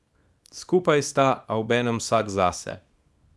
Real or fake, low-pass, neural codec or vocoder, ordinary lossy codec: fake; none; codec, 24 kHz, 0.9 kbps, WavTokenizer, small release; none